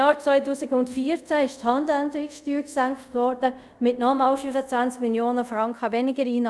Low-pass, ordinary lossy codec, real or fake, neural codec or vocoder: none; none; fake; codec, 24 kHz, 0.5 kbps, DualCodec